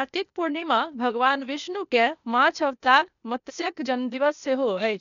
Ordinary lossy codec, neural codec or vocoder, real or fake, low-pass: none; codec, 16 kHz, 0.8 kbps, ZipCodec; fake; 7.2 kHz